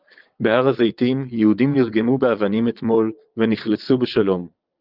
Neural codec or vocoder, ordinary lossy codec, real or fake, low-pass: vocoder, 22.05 kHz, 80 mel bands, Vocos; Opus, 24 kbps; fake; 5.4 kHz